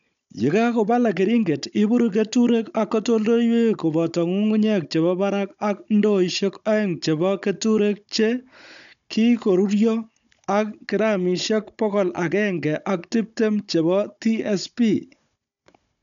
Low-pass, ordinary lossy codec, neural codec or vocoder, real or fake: 7.2 kHz; none; codec, 16 kHz, 16 kbps, FunCodec, trained on Chinese and English, 50 frames a second; fake